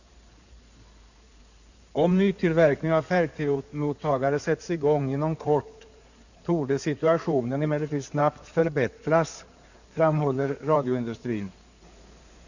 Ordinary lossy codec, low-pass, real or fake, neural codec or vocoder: none; 7.2 kHz; fake; codec, 16 kHz in and 24 kHz out, 2.2 kbps, FireRedTTS-2 codec